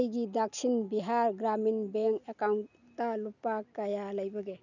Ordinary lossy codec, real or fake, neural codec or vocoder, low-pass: none; real; none; 7.2 kHz